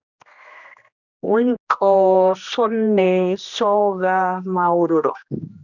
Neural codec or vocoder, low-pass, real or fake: codec, 16 kHz, 1 kbps, X-Codec, HuBERT features, trained on general audio; 7.2 kHz; fake